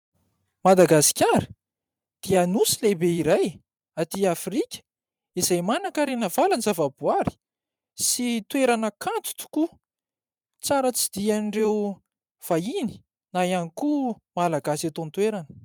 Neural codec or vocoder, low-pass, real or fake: vocoder, 44.1 kHz, 128 mel bands every 512 samples, BigVGAN v2; 19.8 kHz; fake